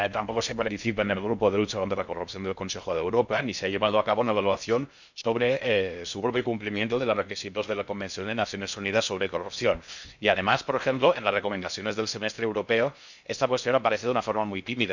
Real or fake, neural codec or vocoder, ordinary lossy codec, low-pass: fake; codec, 16 kHz in and 24 kHz out, 0.6 kbps, FocalCodec, streaming, 2048 codes; none; 7.2 kHz